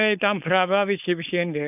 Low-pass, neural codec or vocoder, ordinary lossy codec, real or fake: 3.6 kHz; codec, 16 kHz, 4.8 kbps, FACodec; none; fake